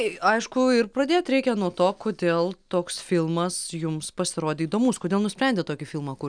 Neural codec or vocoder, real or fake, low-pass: none; real; 9.9 kHz